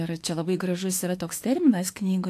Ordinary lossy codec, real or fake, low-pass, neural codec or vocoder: AAC, 64 kbps; fake; 14.4 kHz; autoencoder, 48 kHz, 32 numbers a frame, DAC-VAE, trained on Japanese speech